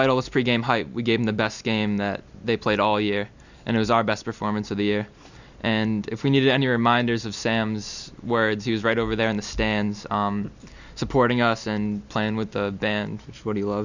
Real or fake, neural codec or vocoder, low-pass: real; none; 7.2 kHz